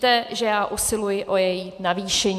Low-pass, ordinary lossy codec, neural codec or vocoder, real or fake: 14.4 kHz; AAC, 96 kbps; vocoder, 44.1 kHz, 128 mel bands every 256 samples, BigVGAN v2; fake